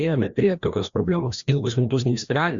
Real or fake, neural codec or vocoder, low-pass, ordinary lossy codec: fake; codec, 16 kHz, 1 kbps, FreqCodec, larger model; 7.2 kHz; Opus, 64 kbps